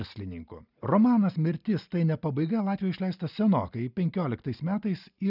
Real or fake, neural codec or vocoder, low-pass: real; none; 5.4 kHz